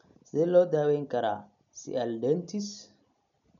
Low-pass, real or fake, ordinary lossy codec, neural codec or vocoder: 7.2 kHz; real; none; none